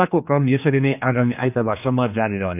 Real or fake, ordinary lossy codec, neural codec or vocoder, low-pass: fake; none; codec, 16 kHz, 2 kbps, X-Codec, HuBERT features, trained on general audio; 3.6 kHz